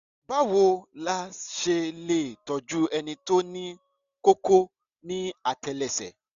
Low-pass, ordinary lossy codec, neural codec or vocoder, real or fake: 7.2 kHz; none; none; real